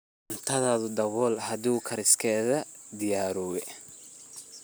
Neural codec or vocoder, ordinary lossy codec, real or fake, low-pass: none; none; real; none